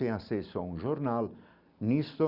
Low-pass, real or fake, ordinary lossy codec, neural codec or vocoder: 5.4 kHz; real; Opus, 64 kbps; none